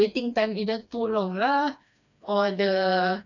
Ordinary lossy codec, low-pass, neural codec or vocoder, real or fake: none; 7.2 kHz; codec, 16 kHz, 2 kbps, FreqCodec, smaller model; fake